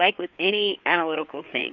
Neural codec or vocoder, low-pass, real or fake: codec, 16 kHz, 4 kbps, FreqCodec, larger model; 7.2 kHz; fake